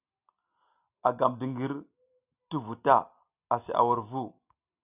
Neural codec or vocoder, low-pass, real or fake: none; 3.6 kHz; real